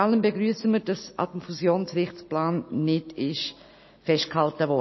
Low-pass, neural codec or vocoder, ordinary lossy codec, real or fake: 7.2 kHz; none; MP3, 24 kbps; real